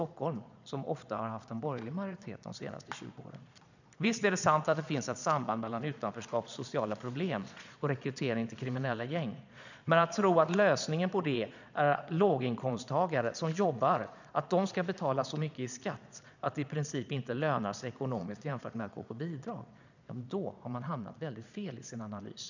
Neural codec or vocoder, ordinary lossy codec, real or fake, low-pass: vocoder, 22.05 kHz, 80 mel bands, Vocos; none; fake; 7.2 kHz